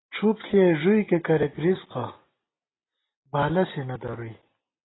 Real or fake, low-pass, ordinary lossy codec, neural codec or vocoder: real; 7.2 kHz; AAC, 16 kbps; none